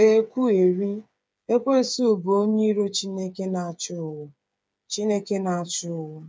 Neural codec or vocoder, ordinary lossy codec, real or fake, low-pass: codec, 16 kHz, 8 kbps, FreqCodec, smaller model; none; fake; none